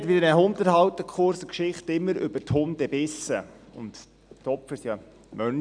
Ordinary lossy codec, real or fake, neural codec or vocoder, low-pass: none; real; none; 9.9 kHz